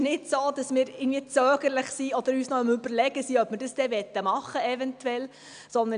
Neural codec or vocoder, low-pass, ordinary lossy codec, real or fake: none; 9.9 kHz; none; real